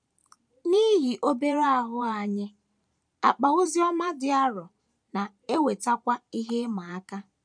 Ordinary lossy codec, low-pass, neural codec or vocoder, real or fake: none; 9.9 kHz; vocoder, 44.1 kHz, 128 mel bands every 512 samples, BigVGAN v2; fake